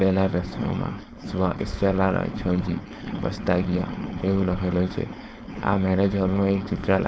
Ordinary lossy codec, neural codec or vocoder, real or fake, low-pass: none; codec, 16 kHz, 4.8 kbps, FACodec; fake; none